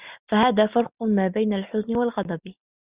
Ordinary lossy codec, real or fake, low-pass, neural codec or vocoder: Opus, 64 kbps; real; 3.6 kHz; none